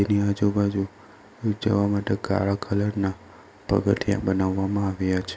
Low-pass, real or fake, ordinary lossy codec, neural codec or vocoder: none; real; none; none